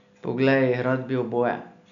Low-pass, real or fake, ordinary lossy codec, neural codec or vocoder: 7.2 kHz; real; none; none